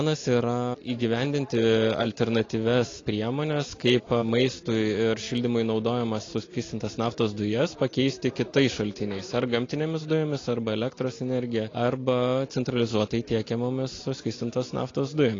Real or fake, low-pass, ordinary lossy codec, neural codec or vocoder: real; 7.2 kHz; AAC, 32 kbps; none